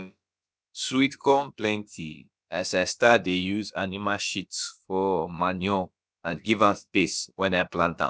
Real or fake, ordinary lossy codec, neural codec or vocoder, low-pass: fake; none; codec, 16 kHz, about 1 kbps, DyCAST, with the encoder's durations; none